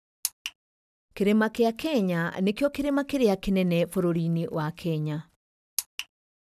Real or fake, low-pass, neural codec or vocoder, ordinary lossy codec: real; 14.4 kHz; none; none